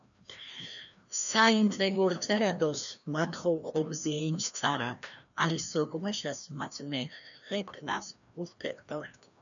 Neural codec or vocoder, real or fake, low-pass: codec, 16 kHz, 1 kbps, FreqCodec, larger model; fake; 7.2 kHz